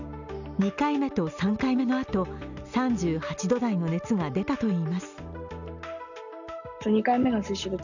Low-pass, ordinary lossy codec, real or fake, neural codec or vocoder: 7.2 kHz; none; real; none